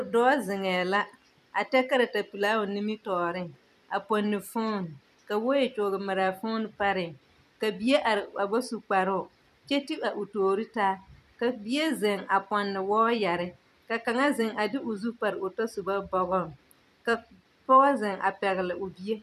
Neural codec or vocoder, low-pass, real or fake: vocoder, 44.1 kHz, 128 mel bands every 256 samples, BigVGAN v2; 14.4 kHz; fake